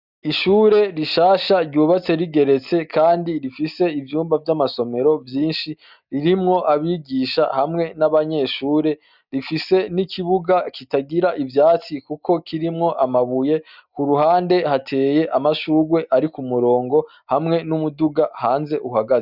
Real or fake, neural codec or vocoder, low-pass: real; none; 5.4 kHz